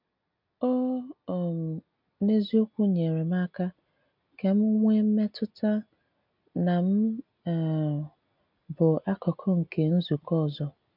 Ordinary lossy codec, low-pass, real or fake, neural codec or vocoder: MP3, 48 kbps; 5.4 kHz; real; none